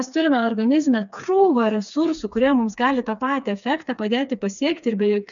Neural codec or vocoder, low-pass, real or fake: codec, 16 kHz, 4 kbps, FreqCodec, smaller model; 7.2 kHz; fake